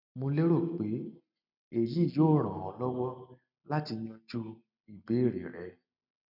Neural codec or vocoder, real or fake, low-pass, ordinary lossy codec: none; real; 5.4 kHz; none